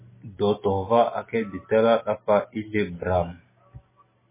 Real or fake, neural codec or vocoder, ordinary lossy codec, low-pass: real; none; MP3, 16 kbps; 3.6 kHz